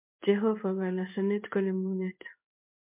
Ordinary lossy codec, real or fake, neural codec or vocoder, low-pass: MP3, 32 kbps; fake; codec, 16 kHz in and 24 kHz out, 1 kbps, XY-Tokenizer; 3.6 kHz